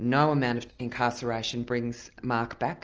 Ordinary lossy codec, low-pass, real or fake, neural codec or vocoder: Opus, 24 kbps; 7.2 kHz; real; none